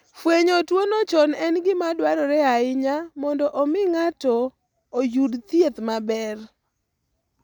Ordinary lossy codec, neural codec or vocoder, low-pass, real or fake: none; none; 19.8 kHz; real